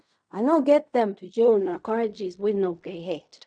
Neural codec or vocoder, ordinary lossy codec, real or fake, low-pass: codec, 16 kHz in and 24 kHz out, 0.4 kbps, LongCat-Audio-Codec, fine tuned four codebook decoder; none; fake; 9.9 kHz